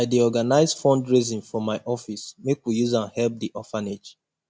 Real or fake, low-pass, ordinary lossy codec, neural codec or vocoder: real; none; none; none